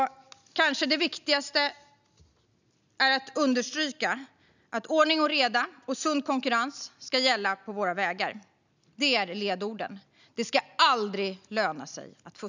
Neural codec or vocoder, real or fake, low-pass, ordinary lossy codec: none; real; 7.2 kHz; none